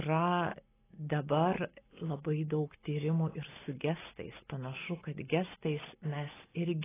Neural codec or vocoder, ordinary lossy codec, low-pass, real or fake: none; AAC, 16 kbps; 3.6 kHz; real